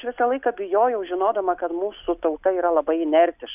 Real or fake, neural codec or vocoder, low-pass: real; none; 3.6 kHz